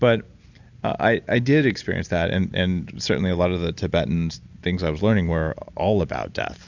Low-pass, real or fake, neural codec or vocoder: 7.2 kHz; real; none